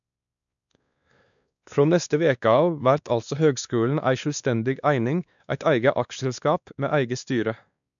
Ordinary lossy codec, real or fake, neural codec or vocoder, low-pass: none; fake; codec, 16 kHz, 2 kbps, X-Codec, WavLM features, trained on Multilingual LibriSpeech; 7.2 kHz